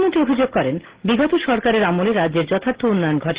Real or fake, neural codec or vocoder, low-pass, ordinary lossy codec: real; none; 3.6 kHz; Opus, 16 kbps